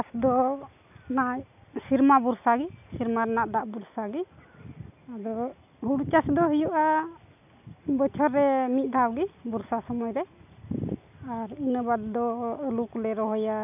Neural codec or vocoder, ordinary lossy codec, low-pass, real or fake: none; none; 3.6 kHz; real